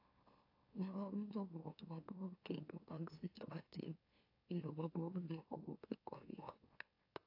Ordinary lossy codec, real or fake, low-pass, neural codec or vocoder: AAC, 32 kbps; fake; 5.4 kHz; autoencoder, 44.1 kHz, a latent of 192 numbers a frame, MeloTTS